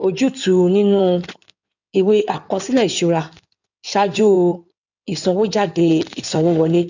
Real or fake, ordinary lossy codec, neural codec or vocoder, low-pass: fake; none; codec, 16 kHz in and 24 kHz out, 2.2 kbps, FireRedTTS-2 codec; 7.2 kHz